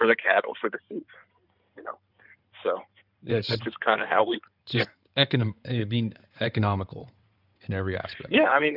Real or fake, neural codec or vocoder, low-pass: fake; codec, 16 kHz in and 24 kHz out, 2.2 kbps, FireRedTTS-2 codec; 5.4 kHz